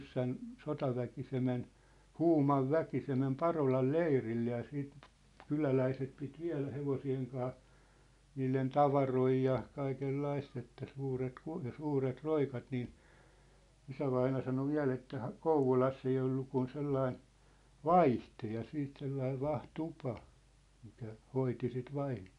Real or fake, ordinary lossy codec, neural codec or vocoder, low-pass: real; none; none; 10.8 kHz